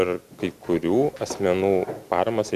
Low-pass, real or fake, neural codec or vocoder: 14.4 kHz; real; none